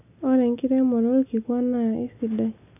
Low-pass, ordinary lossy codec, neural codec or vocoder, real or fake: 3.6 kHz; none; none; real